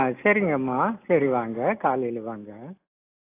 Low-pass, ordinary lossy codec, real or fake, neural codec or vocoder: 3.6 kHz; AAC, 24 kbps; real; none